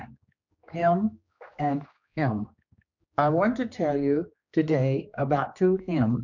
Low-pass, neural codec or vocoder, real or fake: 7.2 kHz; codec, 16 kHz, 2 kbps, X-Codec, HuBERT features, trained on general audio; fake